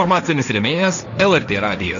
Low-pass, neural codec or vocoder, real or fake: 7.2 kHz; codec, 16 kHz, 1.1 kbps, Voila-Tokenizer; fake